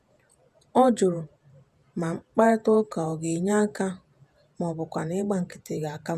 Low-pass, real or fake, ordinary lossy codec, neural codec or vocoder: 14.4 kHz; fake; none; vocoder, 48 kHz, 128 mel bands, Vocos